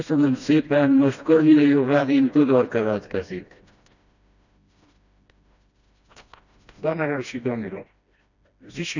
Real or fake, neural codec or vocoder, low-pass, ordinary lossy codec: fake; codec, 16 kHz, 1 kbps, FreqCodec, smaller model; 7.2 kHz; none